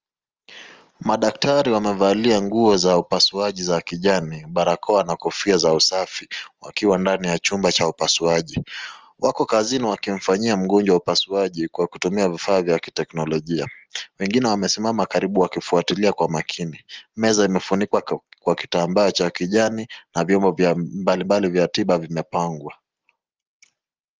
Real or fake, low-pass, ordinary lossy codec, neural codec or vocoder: real; 7.2 kHz; Opus, 24 kbps; none